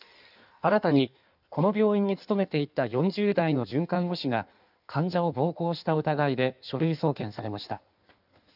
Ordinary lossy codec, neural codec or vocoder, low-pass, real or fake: none; codec, 16 kHz in and 24 kHz out, 1.1 kbps, FireRedTTS-2 codec; 5.4 kHz; fake